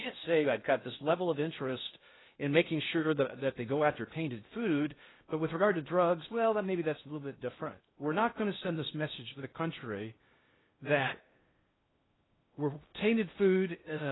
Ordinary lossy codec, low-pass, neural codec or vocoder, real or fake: AAC, 16 kbps; 7.2 kHz; codec, 16 kHz in and 24 kHz out, 0.6 kbps, FocalCodec, streaming, 4096 codes; fake